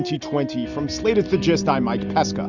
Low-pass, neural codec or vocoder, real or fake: 7.2 kHz; none; real